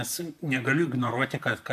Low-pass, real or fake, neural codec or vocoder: 14.4 kHz; fake; vocoder, 44.1 kHz, 128 mel bands, Pupu-Vocoder